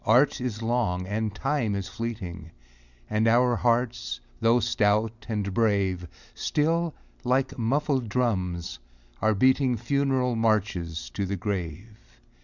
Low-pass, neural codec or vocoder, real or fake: 7.2 kHz; none; real